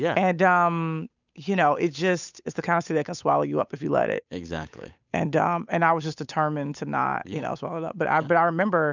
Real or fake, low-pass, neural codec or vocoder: fake; 7.2 kHz; codec, 16 kHz, 8 kbps, FunCodec, trained on Chinese and English, 25 frames a second